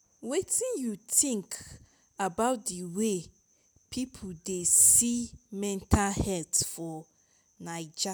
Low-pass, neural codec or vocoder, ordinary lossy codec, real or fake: none; none; none; real